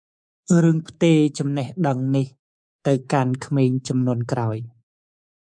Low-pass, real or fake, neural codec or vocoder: 9.9 kHz; fake; codec, 24 kHz, 3.1 kbps, DualCodec